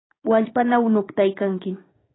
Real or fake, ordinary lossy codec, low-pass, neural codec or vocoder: fake; AAC, 16 kbps; 7.2 kHz; codec, 16 kHz, 6 kbps, DAC